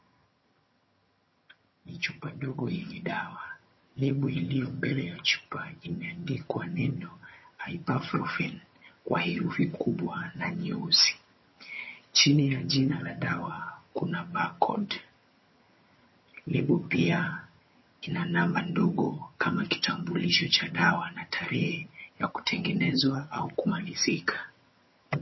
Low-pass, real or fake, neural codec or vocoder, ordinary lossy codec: 7.2 kHz; fake; vocoder, 22.05 kHz, 80 mel bands, HiFi-GAN; MP3, 24 kbps